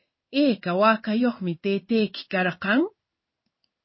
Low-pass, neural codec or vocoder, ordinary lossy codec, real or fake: 7.2 kHz; codec, 16 kHz in and 24 kHz out, 1 kbps, XY-Tokenizer; MP3, 24 kbps; fake